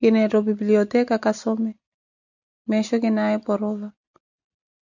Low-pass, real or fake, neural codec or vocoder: 7.2 kHz; real; none